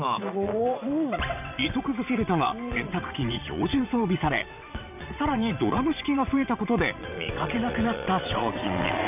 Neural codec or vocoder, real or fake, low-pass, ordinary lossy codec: vocoder, 22.05 kHz, 80 mel bands, WaveNeXt; fake; 3.6 kHz; none